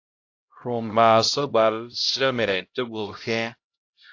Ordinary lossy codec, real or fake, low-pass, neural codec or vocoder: AAC, 48 kbps; fake; 7.2 kHz; codec, 16 kHz, 0.5 kbps, X-Codec, HuBERT features, trained on LibriSpeech